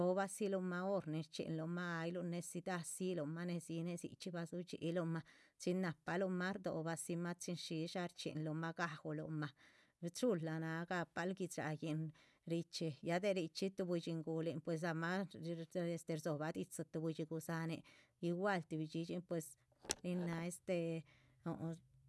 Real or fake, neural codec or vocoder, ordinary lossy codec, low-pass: real; none; none; none